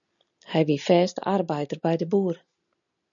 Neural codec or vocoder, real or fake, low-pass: none; real; 7.2 kHz